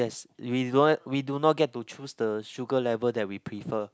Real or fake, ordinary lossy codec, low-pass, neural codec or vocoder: real; none; none; none